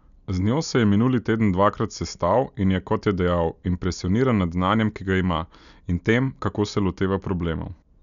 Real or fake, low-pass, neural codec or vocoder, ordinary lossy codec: real; 7.2 kHz; none; none